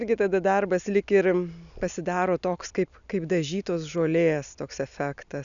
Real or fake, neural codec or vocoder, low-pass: real; none; 7.2 kHz